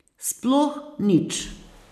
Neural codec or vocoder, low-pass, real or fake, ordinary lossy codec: none; 14.4 kHz; real; none